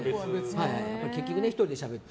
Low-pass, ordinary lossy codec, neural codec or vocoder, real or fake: none; none; none; real